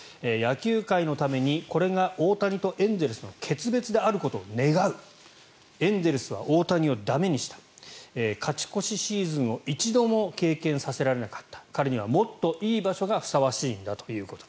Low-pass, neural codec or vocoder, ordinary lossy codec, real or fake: none; none; none; real